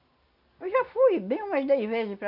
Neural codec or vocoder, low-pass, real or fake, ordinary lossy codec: none; 5.4 kHz; real; none